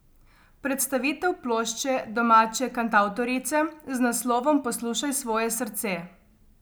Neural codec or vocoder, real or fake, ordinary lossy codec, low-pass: none; real; none; none